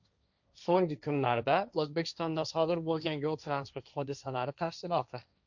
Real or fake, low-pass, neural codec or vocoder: fake; 7.2 kHz; codec, 16 kHz, 1.1 kbps, Voila-Tokenizer